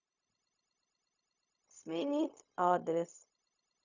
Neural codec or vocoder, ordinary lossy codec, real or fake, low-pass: codec, 16 kHz, 0.4 kbps, LongCat-Audio-Codec; none; fake; 7.2 kHz